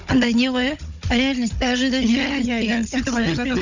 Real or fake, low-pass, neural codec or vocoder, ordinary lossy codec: fake; 7.2 kHz; codec, 16 kHz, 16 kbps, FunCodec, trained on Chinese and English, 50 frames a second; none